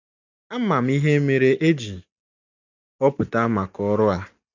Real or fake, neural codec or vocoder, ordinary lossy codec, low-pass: real; none; none; 7.2 kHz